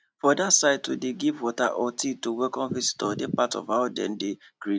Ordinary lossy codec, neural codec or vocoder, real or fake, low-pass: none; none; real; none